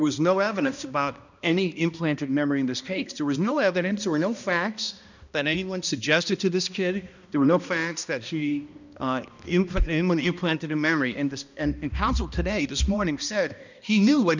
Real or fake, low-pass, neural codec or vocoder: fake; 7.2 kHz; codec, 16 kHz, 1 kbps, X-Codec, HuBERT features, trained on balanced general audio